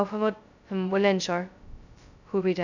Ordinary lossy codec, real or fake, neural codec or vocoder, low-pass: none; fake; codec, 16 kHz, 0.2 kbps, FocalCodec; 7.2 kHz